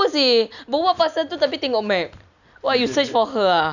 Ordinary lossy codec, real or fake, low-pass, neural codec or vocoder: none; real; 7.2 kHz; none